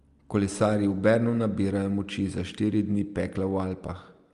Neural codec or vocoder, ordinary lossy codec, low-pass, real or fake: none; Opus, 32 kbps; 10.8 kHz; real